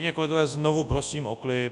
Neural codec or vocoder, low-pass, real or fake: codec, 24 kHz, 0.9 kbps, WavTokenizer, large speech release; 10.8 kHz; fake